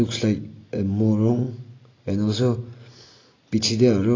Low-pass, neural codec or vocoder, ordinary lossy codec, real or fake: 7.2 kHz; none; AAC, 32 kbps; real